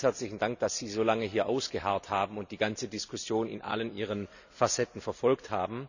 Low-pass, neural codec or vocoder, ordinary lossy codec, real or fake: 7.2 kHz; none; none; real